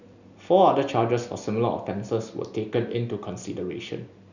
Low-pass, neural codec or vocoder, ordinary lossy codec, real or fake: 7.2 kHz; none; none; real